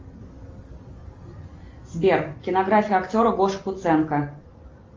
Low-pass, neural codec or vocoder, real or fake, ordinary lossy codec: 7.2 kHz; none; real; Opus, 32 kbps